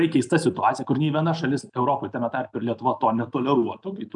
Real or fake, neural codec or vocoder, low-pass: real; none; 10.8 kHz